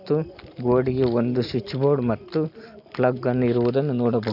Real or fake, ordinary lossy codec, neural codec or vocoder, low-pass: real; AAC, 48 kbps; none; 5.4 kHz